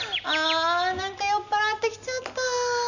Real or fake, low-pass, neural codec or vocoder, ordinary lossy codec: real; 7.2 kHz; none; none